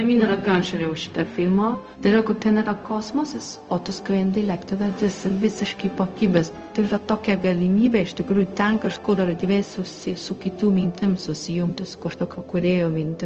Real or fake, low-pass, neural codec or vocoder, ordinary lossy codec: fake; 7.2 kHz; codec, 16 kHz, 0.4 kbps, LongCat-Audio-Codec; Opus, 24 kbps